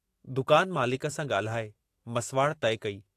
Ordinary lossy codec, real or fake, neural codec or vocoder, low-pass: AAC, 48 kbps; fake; autoencoder, 48 kHz, 128 numbers a frame, DAC-VAE, trained on Japanese speech; 14.4 kHz